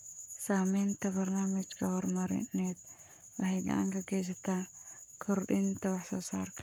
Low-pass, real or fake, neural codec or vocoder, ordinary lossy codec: none; fake; codec, 44.1 kHz, 7.8 kbps, Pupu-Codec; none